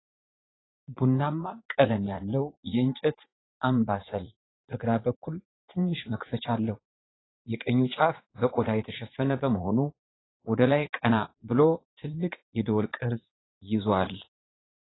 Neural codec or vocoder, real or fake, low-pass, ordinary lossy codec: vocoder, 44.1 kHz, 80 mel bands, Vocos; fake; 7.2 kHz; AAC, 16 kbps